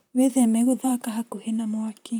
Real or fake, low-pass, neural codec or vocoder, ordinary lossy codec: fake; none; vocoder, 44.1 kHz, 128 mel bands, Pupu-Vocoder; none